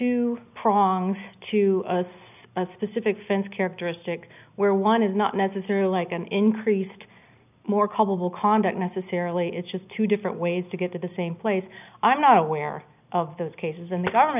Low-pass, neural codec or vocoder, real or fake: 3.6 kHz; none; real